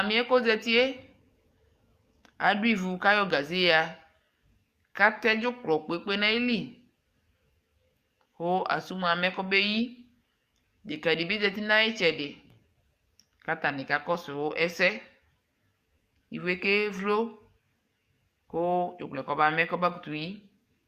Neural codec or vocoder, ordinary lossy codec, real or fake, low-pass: codec, 44.1 kHz, 7.8 kbps, DAC; Opus, 64 kbps; fake; 14.4 kHz